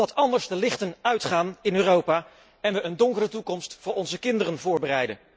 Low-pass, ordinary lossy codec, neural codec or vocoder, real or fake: none; none; none; real